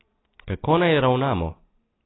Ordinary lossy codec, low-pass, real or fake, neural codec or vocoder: AAC, 16 kbps; 7.2 kHz; real; none